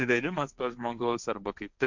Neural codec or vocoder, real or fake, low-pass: codec, 16 kHz, 1.1 kbps, Voila-Tokenizer; fake; 7.2 kHz